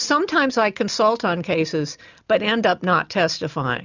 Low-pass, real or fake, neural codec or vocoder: 7.2 kHz; real; none